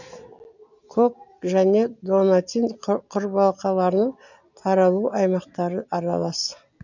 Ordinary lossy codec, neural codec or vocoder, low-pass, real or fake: none; none; 7.2 kHz; real